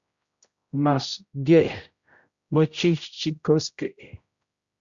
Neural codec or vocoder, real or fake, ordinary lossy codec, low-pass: codec, 16 kHz, 0.5 kbps, X-Codec, HuBERT features, trained on general audio; fake; Opus, 64 kbps; 7.2 kHz